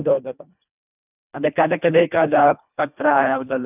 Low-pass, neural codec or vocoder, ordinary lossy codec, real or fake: 3.6 kHz; codec, 24 kHz, 1.5 kbps, HILCodec; none; fake